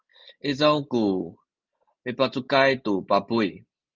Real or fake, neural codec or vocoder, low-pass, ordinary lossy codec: real; none; 7.2 kHz; Opus, 24 kbps